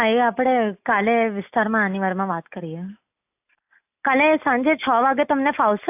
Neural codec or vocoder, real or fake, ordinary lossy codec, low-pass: none; real; AAC, 32 kbps; 3.6 kHz